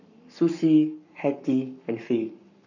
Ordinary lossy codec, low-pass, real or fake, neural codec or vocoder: none; 7.2 kHz; fake; codec, 44.1 kHz, 7.8 kbps, Pupu-Codec